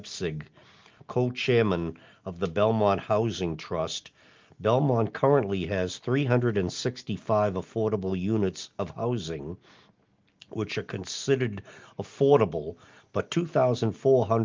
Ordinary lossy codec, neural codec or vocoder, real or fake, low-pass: Opus, 32 kbps; none; real; 7.2 kHz